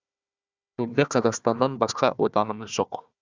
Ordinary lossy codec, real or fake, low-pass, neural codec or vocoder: Opus, 64 kbps; fake; 7.2 kHz; codec, 16 kHz, 1 kbps, FunCodec, trained on Chinese and English, 50 frames a second